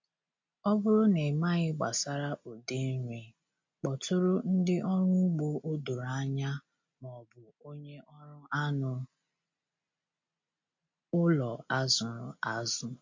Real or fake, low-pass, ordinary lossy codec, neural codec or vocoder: real; 7.2 kHz; MP3, 48 kbps; none